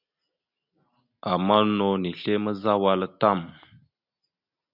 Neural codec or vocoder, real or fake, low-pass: none; real; 5.4 kHz